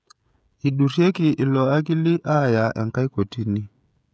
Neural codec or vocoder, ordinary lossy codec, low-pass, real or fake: codec, 16 kHz, 16 kbps, FreqCodec, smaller model; none; none; fake